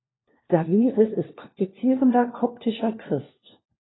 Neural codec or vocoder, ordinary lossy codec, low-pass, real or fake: codec, 16 kHz, 1 kbps, FunCodec, trained on LibriTTS, 50 frames a second; AAC, 16 kbps; 7.2 kHz; fake